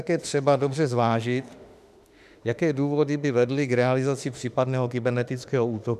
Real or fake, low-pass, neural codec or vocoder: fake; 14.4 kHz; autoencoder, 48 kHz, 32 numbers a frame, DAC-VAE, trained on Japanese speech